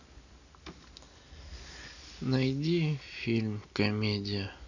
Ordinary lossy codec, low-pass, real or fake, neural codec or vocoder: none; 7.2 kHz; real; none